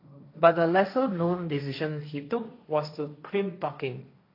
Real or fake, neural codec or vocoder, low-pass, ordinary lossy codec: fake; codec, 16 kHz, 1.1 kbps, Voila-Tokenizer; 5.4 kHz; AAC, 32 kbps